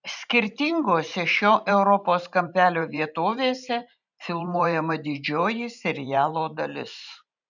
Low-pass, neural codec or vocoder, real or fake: 7.2 kHz; vocoder, 44.1 kHz, 128 mel bands every 512 samples, BigVGAN v2; fake